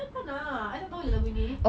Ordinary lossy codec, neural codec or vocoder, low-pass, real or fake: none; none; none; real